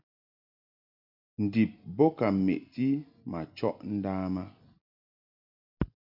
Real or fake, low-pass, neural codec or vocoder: real; 5.4 kHz; none